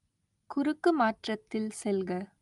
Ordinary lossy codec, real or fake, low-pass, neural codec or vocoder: Opus, 32 kbps; real; 10.8 kHz; none